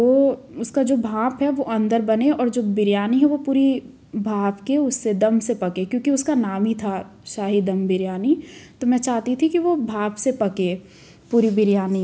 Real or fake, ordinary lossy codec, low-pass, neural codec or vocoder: real; none; none; none